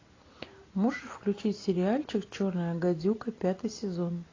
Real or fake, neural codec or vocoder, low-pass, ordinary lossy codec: real; none; 7.2 kHz; AAC, 32 kbps